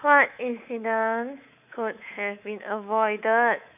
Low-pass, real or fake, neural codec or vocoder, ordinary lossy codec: 3.6 kHz; fake; codec, 24 kHz, 3.1 kbps, DualCodec; none